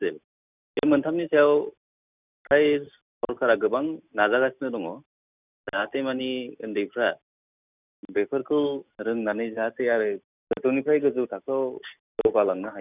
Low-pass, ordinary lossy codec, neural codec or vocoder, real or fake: 3.6 kHz; none; none; real